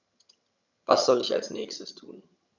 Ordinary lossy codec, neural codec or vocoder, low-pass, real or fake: none; vocoder, 22.05 kHz, 80 mel bands, HiFi-GAN; 7.2 kHz; fake